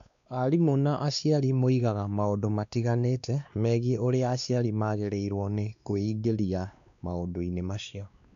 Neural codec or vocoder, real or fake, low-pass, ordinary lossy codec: codec, 16 kHz, 2 kbps, X-Codec, WavLM features, trained on Multilingual LibriSpeech; fake; 7.2 kHz; none